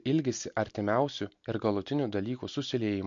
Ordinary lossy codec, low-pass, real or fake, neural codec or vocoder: MP3, 48 kbps; 7.2 kHz; real; none